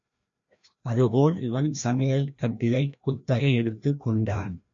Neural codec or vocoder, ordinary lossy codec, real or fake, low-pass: codec, 16 kHz, 1 kbps, FreqCodec, larger model; MP3, 48 kbps; fake; 7.2 kHz